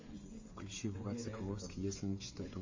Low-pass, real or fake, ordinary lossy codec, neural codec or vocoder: 7.2 kHz; fake; MP3, 32 kbps; codec, 16 kHz, 16 kbps, FreqCodec, smaller model